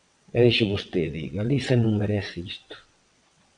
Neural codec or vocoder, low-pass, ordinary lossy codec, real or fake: vocoder, 22.05 kHz, 80 mel bands, WaveNeXt; 9.9 kHz; MP3, 96 kbps; fake